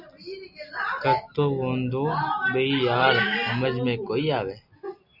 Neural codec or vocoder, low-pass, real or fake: none; 5.4 kHz; real